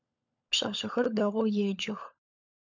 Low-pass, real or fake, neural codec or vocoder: 7.2 kHz; fake; codec, 16 kHz, 16 kbps, FunCodec, trained on LibriTTS, 50 frames a second